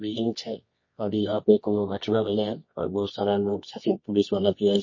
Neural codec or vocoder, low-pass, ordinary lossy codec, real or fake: codec, 24 kHz, 0.9 kbps, WavTokenizer, medium music audio release; 7.2 kHz; MP3, 32 kbps; fake